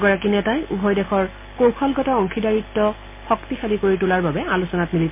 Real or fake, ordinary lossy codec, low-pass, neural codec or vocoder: real; MP3, 24 kbps; 3.6 kHz; none